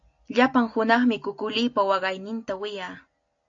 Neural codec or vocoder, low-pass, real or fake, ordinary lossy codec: none; 7.2 kHz; real; AAC, 48 kbps